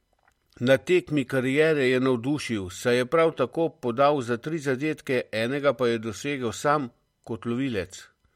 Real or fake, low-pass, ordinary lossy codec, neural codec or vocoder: real; 19.8 kHz; MP3, 64 kbps; none